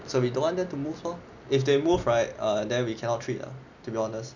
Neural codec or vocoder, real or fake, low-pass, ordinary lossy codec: none; real; 7.2 kHz; none